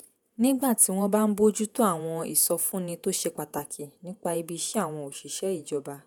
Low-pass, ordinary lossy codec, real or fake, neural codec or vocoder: none; none; fake; vocoder, 48 kHz, 128 mel bands, Vocos